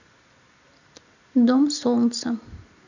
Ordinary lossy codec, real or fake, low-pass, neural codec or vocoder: none; real; 7.2 kHz; none